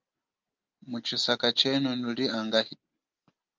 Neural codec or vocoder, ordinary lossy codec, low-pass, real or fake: none; Opus, 32 kbps; 7.2 kHz; real